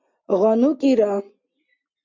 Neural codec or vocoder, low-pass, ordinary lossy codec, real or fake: none; 7.2 kHz; MP3, 64 kbps; real